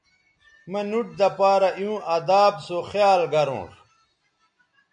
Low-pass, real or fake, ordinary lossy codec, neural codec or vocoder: 9.9 kHz; real; MP3, 96 kbps; none